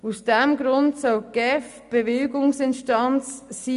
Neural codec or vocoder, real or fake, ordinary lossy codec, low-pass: none; real; none; 10.8 kHz